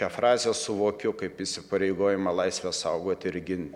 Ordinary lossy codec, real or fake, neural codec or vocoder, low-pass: MP3, 96 kbps; real; none; 19.8 kHz